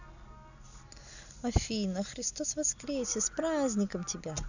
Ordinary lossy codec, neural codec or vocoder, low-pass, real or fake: none; none; 7.2 kHz; real